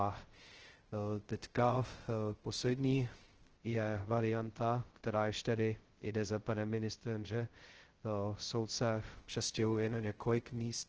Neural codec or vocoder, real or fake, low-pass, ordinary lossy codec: codec, 16 kHz, 0.2 kbps, FocalCodec; fake; 7.2 kHz; Opus, 16 kbps